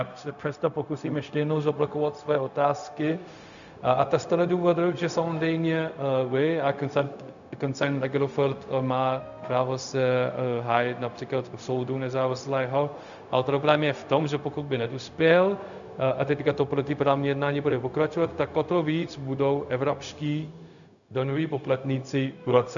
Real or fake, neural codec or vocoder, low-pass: fake; codec, 16 kHz, 0.4 kbps, LongCat-Audio-Codec; 7.2 kHz